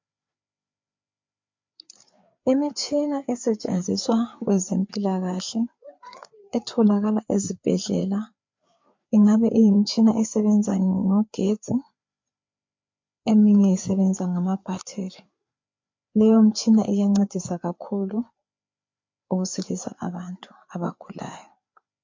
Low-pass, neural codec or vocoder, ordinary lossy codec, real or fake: 7.2 kHz; codec, 16 kHz, 4 kbps, FreqCodec, larger model; MP3, 48 kbps; fake